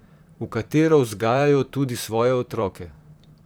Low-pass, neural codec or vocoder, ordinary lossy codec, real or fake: none; vocoder, 44.1 kHz, 128 mel bands, Pupu-Vocoder; none; fake